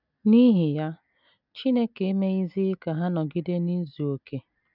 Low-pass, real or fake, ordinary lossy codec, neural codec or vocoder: 5.4 kHz; real; none; none